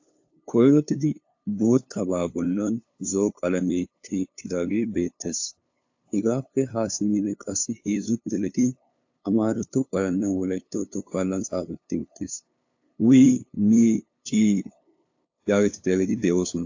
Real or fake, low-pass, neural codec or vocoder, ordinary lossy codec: fake; 7.2 kHz; codec, 16 kHz, 2 kbps, FunCodec, trained on LibriTTS, 25 frames a second; AAC, 48 kbps